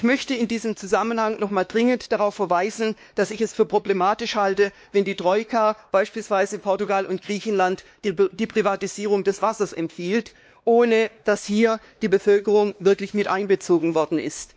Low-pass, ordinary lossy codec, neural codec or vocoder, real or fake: none; none; codec, 16 kHz, 2 kbps, X-Codec, WavLM features, trained on Multilingual LibriSpeech; fake